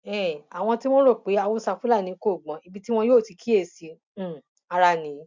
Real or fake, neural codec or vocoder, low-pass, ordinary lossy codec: real; none; 7.2 kHz; MP3, 64 kbps